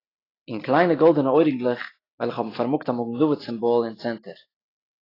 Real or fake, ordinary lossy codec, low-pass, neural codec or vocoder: real; AAC, 24 kbps; 5.4 kHz; none